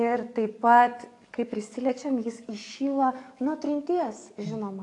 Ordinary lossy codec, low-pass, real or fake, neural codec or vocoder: AAC, 48 kbps; 10.8 kHz; fake; codec, 44.1 kHz, 7.8 kbps, DAC